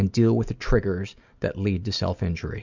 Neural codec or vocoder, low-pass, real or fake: none; 7.2 kHz; real